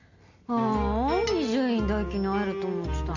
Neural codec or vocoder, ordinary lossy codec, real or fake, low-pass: none; none; real; 7.2 kHz